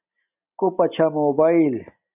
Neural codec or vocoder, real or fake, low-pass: none; real; 3.6 kHz